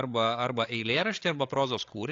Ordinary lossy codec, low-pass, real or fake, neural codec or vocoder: AAC, 48 kbps; 7.2 kHz; fake; codec, 16 kHz, 8 kbps, FreqCodec, larger model